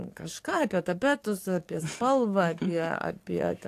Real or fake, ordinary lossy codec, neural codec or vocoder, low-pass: fake; AAC, 64 kbps; codec, 44.1 kHz, 7.8 kbps, DAC; 14.4 kHz